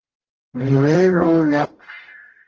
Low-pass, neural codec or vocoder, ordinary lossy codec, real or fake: 7.2 kHz; codec, 44.1 kHz, 0.9 kbps, DAC; Opus, 16 kbps; fake